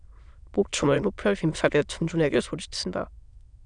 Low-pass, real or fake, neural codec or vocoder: 9.9 kHz; fake; autoencoder, 22.05 kHz, a latent of 192 numbers a frame, VITS, trained on many speakers